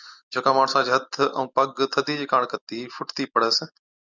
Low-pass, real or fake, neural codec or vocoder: 7.2 kHz; real; none